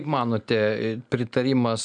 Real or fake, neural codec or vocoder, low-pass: real; none; 9.9 kHz